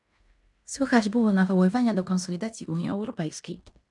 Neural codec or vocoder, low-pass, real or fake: codec, 16 kHz in and 24 kHz out, 0.9 kbps, LongCat-Audio-Codec, fine tuned four codebook decoder; 10.8 kHz; fake